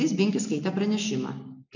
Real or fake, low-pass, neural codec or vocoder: real; 7.2 kHz; none